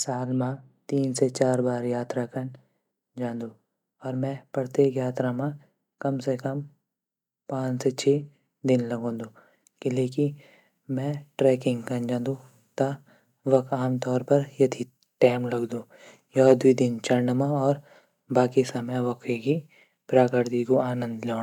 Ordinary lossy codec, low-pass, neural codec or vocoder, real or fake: none; 19.8 kHz; none; real